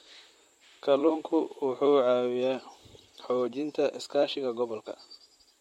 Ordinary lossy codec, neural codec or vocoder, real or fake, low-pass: MP3, 64 kbps; vocoder, 44.1 kHz, 128 mel bands, Pupu-Vocoder; fake; 19.8 kHz